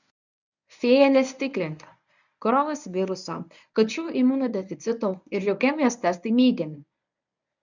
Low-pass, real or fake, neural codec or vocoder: 7.2 kHz; fake; codec, 24 kHz, 0.9 kbps, WavTokenizer, medium speech release version 1